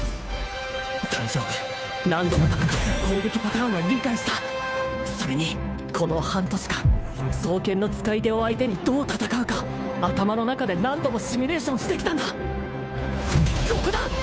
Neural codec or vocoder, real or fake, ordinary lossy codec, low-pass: codec, 16 kHz, 2 kbps, FunCodec, trained on Chinese and English, 25 frames a second; fake; none; none